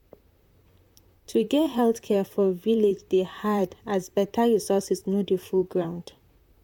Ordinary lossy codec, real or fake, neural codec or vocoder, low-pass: MP3, 96 kbps; fake; vocoder, 44.1 kHz, 128 mel bands, Pupu-Vocoder; 19.8 kHz